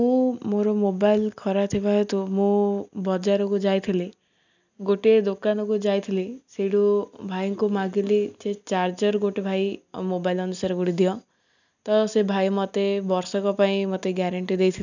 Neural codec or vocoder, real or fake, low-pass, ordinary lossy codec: none; real; 7.2 kHz; none